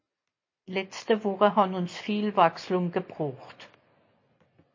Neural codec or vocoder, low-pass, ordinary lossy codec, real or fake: none; 7.2 kHz; MP3, 32 kbps; real